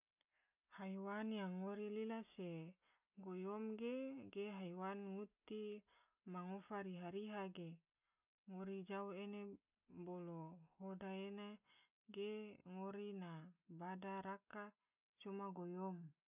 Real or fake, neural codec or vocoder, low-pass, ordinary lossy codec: real; none; 3.6 kHz; none